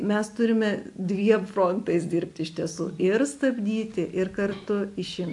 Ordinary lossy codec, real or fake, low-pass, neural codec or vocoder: AAC, 64 kbps; real; 10.8 kHz; none